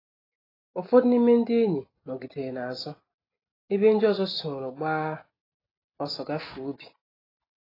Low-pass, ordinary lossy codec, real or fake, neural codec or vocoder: 5.4 kHz; AAC, 24 kbps; real; none